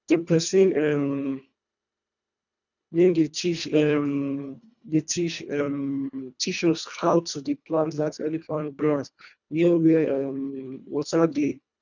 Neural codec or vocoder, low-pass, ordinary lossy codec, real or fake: codec, 24 kHz, 1.5 kbps, HILCodec; 7.2 kHz; none; fake